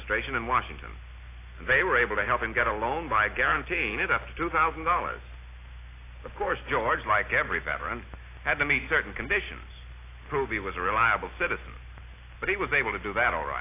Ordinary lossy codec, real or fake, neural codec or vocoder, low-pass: AAC, 24 kbps; real; none; 3.6 kHz